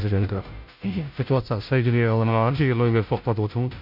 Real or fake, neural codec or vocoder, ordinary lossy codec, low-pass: fake; codec, 16 kHz, 0.5 kbps, FunCodec, trained on Chinese and English, 25 frames a second; none; 5.4 kHz